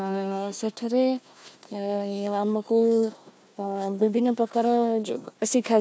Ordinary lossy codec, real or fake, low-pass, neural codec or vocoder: none; fake; none; codec, 16 kHz, 1 kbps, FunCodec, trained on Chinese and English, 50 frames a second